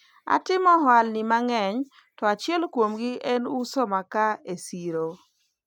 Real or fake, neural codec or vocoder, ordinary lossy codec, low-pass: real; none; none; none